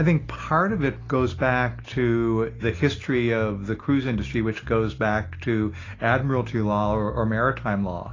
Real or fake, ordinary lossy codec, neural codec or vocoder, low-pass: real; AAC, 32 kbps; none; 7.2 kHz